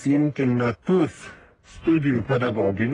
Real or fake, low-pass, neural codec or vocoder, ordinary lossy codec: fake; 10.8 kHz; codec, 44.1 kHz, 1.7 kbps, Pupu-Codec; AAC, 32 kbps